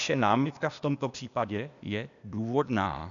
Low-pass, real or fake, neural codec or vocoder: 7.2 kHz; fake; codec, 16 kHz, 0.8 kbps, ZipCodec